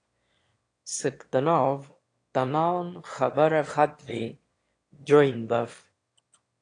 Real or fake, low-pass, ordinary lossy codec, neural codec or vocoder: fake; 9.9 kHz; AAC, 48 kbps; autoencoder, 22.05 kHz, a latent of 192 numbers a frame, VITS, trained on one speaker